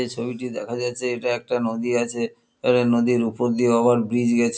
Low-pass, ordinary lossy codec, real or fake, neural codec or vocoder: none; none; real; none